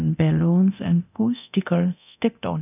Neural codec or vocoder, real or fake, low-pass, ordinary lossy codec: codec, 24 kHz, 0.9 kbps, DualCodec; fake; 3.6 kHz; none